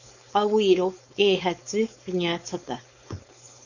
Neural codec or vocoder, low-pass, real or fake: codec, 16 kHz, 4.8 kbps, FACodec; 7.2 kHz; fake